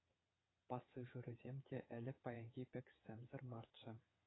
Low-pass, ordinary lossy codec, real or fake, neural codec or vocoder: 7.2 kHz; AAC, 16 kbps; fake; vocoder, 22.05 kHz, 80 mel bands, WaveNeXt